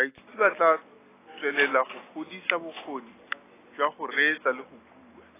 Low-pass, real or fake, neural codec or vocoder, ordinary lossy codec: 3.6 kHz; real; none; AAC, 16 kbps